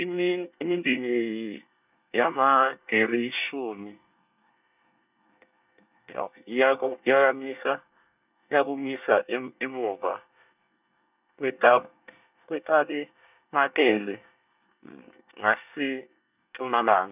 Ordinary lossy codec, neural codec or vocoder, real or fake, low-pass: none; codec, 24 kHz, 1 kbps, SNAC; fake; 3.6 kHz